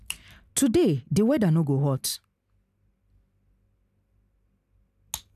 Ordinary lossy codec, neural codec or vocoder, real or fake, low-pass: none; none; real; 14.4 kHz